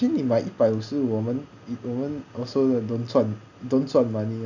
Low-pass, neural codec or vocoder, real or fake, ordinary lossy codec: 7.2 kHz; none; real; none